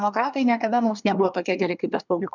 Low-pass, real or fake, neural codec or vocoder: 7.2 kHz; fake; codec, 24 kHz, 1 kbps, SNAC